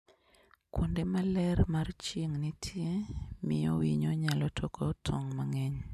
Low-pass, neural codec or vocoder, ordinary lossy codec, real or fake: 14.4 kHz; none; none; real